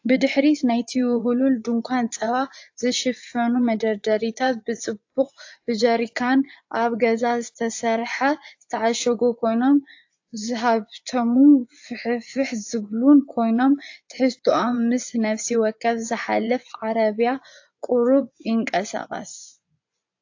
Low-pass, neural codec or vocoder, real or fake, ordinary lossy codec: 7.2 kHz; vocoder, 24 kHz, 100 mel bands, Vocos; fake; AAC, 48 kbps